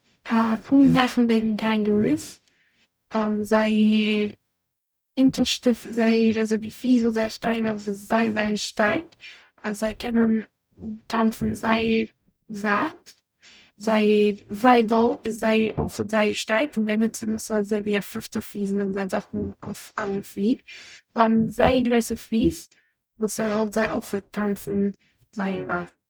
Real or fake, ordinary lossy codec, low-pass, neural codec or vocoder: fake; none; none; codec, 44.1 kHz, 0.9 kbps, DAC